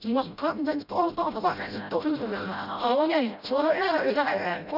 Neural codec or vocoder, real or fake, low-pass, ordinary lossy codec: codec, 16 kHz, 0.5 kbps, FreqCodec, smaller model; fake; 5.4 kHz; none